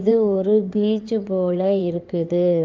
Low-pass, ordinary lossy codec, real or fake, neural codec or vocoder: 7.2 kHz; Opus, 24 kbps; fake; codec, 16 kHz in and 24 kHz out, 2.2 kbps, FireRedTTS-2 codec